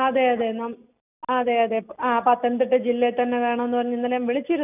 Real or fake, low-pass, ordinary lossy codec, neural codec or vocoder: real; 3.6 kHz; none; none